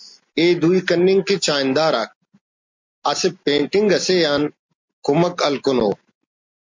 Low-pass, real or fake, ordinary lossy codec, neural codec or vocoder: 7.2 kHz; real; MP3, 48 kbps; none